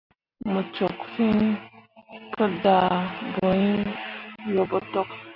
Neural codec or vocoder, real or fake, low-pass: none; real; 5.4 kHz